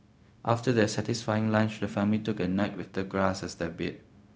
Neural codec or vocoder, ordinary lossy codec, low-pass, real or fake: codec, 16 kHz, 0.4 kbps, LongCat-Audio-Codec; none; none; fake